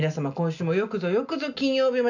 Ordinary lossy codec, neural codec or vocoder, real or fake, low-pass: none; none; real; 7.2 kHz